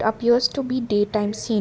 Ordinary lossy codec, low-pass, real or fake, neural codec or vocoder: none; none; real; none